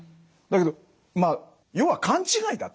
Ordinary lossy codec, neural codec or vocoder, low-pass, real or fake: none; none; none; real